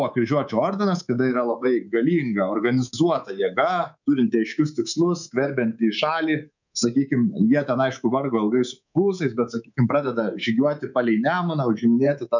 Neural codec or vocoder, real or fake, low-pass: codec, 24 kHz, 3.1 kbps, DualCodec; fake; 7.2 kHz